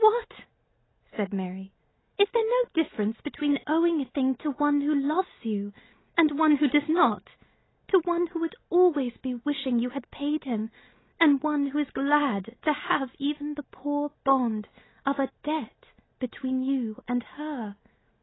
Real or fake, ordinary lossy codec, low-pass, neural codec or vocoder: real; AAC, 16 kbps; 7.2 kHz; none